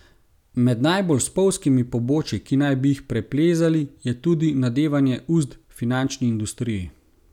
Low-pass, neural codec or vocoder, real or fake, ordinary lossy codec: 19.8 kHz; none; real; none